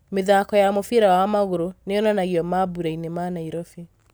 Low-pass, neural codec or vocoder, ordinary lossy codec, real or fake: none; none; none; real